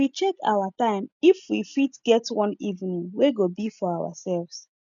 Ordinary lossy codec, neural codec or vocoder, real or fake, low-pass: none; none; real; 7.2 kHz